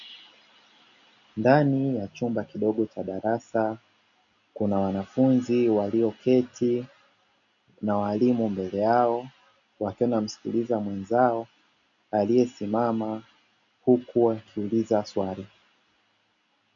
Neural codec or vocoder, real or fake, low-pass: none; real; 7.2 kHz